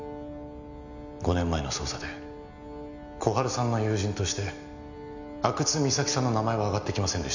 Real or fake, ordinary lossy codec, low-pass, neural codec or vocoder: real; none; 7.2 kHz; none